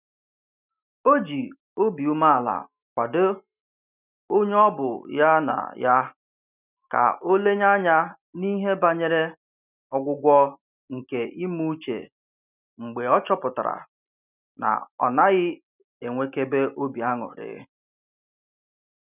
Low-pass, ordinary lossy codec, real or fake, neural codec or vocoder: 3.6 kHz; none; real; none